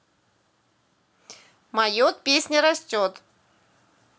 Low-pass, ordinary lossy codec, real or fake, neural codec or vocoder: none; none; real; none